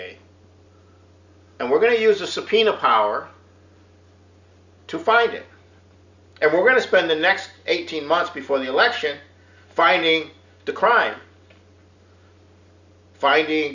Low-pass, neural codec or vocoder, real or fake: 7.2 kHz; none; real